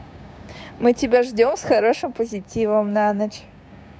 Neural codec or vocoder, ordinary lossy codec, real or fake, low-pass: codec, 16 kHz, 6 kbps, DAC; none; fake; none